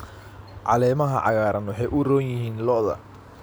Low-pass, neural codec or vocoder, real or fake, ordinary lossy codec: none; none; real; none